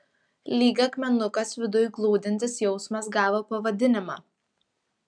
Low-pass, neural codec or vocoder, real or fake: 9.9 kHz; none; real